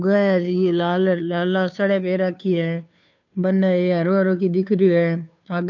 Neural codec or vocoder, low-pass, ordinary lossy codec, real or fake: codec, 16 kHz, 2 kbps, FunCodec, trained on Chinese and English, 25 frames a second; 7.2 kHz; none; fake